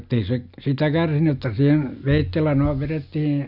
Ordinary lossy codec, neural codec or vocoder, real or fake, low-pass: none; none; real; 5.4 kHz